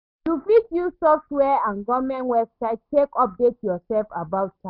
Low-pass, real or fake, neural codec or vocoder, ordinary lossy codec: 5.4 kHz; real; none; none